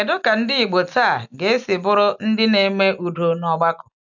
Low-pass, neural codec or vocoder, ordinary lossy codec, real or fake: 7.2 kHz; none; none; real